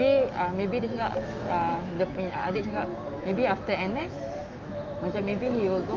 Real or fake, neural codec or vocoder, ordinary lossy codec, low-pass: fake; autoencoder, 48 kHz, 128 numbers a frame, DAC-VAE, trained on Japanese speech; Opus, 24 kbps; 7.2 kHz